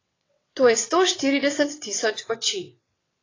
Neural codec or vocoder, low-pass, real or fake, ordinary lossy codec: vocoder, 22.05 kHz, 80 mel bands, WaveNeXt; 7.2 kHz; fake; AAC, 32 kbps